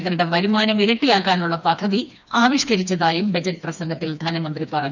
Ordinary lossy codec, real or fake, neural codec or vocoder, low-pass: none; fake; codec, 16 kHz, 2 kbps, FreqCodec, smaller model; 7.2 kHz